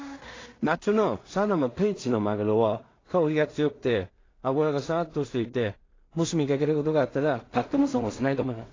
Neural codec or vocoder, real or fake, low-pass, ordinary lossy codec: codec, 16 kHz in and 24 kHz out, 0.4 kbps, LongCat-Audio-Codec, two codebook decoder; fake; 7.2 kHz; AAC, 32 kbps